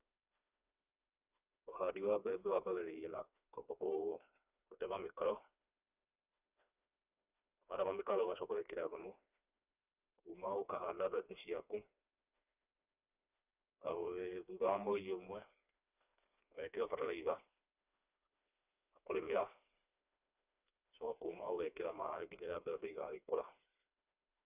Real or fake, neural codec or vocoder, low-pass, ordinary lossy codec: fake; codec, 16 kHz, 2 kbps, FreqCodec, smaller model; 3.6 kHz; none